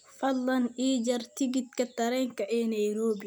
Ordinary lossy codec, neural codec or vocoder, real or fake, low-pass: none; none; real; none